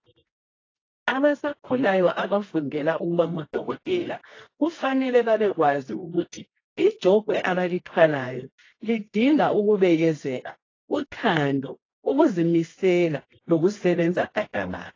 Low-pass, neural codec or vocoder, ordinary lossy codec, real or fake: 7.2 kHz; codec, 24 kHz, 0.9 kbps, WavTokenizer, medium music audio release; AAC, 32 kbps; fake